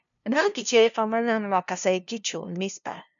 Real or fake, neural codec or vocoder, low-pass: fake; codec, 16 kHz, 0.5 kbps, FunCodec, trained on LibriTTS, 25 frames a second; 7.2 kHz